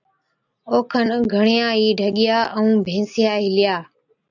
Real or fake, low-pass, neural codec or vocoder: real; 7.2 kHz; none